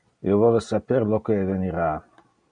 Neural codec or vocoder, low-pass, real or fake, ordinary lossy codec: none; 9.9 kHz; real; MP3, 48 kbps